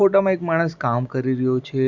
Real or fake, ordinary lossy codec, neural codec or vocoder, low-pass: real; Opus, 64 kbps; none; 7.2 kHz